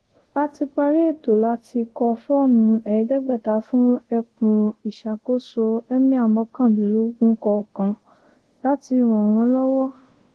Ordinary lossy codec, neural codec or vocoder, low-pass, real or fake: Opus, 16 kbps; codec, 24 kHz, 0.5 kbps, DualCodec; 10.8 kHz; fake